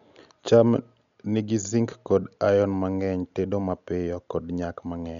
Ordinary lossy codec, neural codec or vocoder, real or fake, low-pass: none; none; real; 7.2 kHz